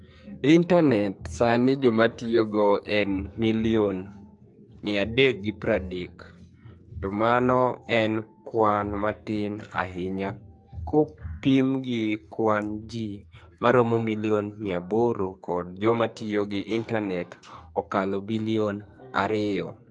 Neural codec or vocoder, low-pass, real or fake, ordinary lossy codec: codec, 44.1 kHz, 2.6 kbps, SNAC; 10.8 kHz; fake; AAC, 64 kbps